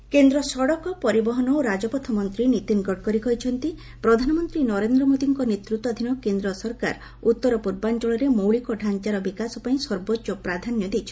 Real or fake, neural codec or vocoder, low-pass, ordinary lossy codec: real; none; none; none